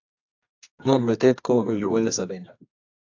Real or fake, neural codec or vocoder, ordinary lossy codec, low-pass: fake; codec, 24 kHz, 0.9 kbps, WavTokenizer, medium music audio release; AAC, 48 kbps; 7.2 kHz